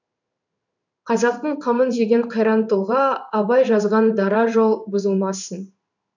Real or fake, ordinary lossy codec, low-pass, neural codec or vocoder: fake; none; 7.2 kHz; codec, 16 kHz in and 24 kHz out, 1 kbps, XY-Tokenizer